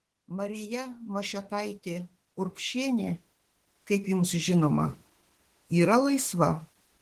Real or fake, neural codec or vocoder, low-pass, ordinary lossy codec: fake; autoencoder, 48 kHz, 32 numbers a frame, DAC-VAE, trained on Japanese speech; 14.4 kHz; Opus, 16 kbps